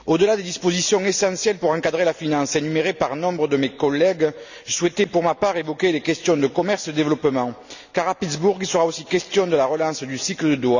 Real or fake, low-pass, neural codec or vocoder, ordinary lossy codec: real; 7.2 kHz; none; none